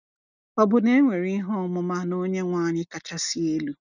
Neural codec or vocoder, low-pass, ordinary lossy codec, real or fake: none; 7.2 kHz; none; real